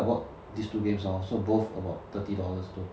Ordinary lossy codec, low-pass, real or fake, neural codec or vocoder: none; none; real; none